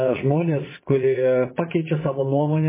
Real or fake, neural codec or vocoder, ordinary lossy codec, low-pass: fake; vocoder, 22.05 kHz, 80 mel bands, Vocos; MP3, 16 kbps; 3.6 kHz